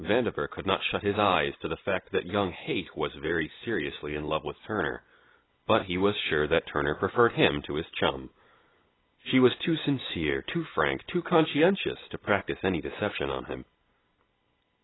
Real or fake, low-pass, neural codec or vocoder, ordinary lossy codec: real; 7.2 kHz; none; AAC, 16 kbps